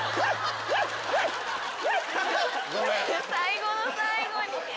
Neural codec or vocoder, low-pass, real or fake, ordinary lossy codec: none; none; real; none